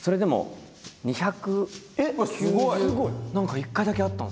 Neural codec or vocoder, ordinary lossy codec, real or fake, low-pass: none; none; real; none